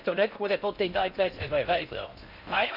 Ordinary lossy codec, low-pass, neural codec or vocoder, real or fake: none; 5.4 kHz; codec, 16 kHz in and 24 kHz out, 0.6 kbps, FocalCodec, streaming, 4096 codes; fake